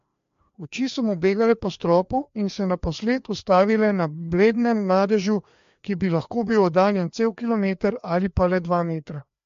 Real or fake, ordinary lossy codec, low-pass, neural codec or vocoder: fake; MP3, 48 kbps; 7.2 kHz; codec, 16 kHz, 2 kbps, FreqCodec, larger model